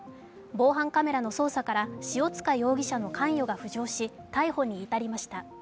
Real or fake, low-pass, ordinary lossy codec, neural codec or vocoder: real; none; none; none